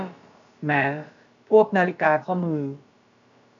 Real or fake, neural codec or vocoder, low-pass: fake; codec, 16 kHz, about 1 kbps, DyCAST, with the encoder's durations; 7.2 kHz